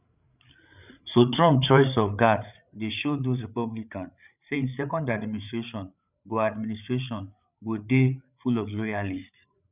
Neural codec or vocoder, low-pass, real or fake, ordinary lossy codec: codec, 16 kHz, 8 kbps, FreqCodec, larger model; 3.6 kHz; fake; none